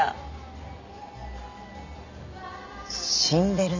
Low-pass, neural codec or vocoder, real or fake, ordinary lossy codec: 7.2 kHz; none; real; none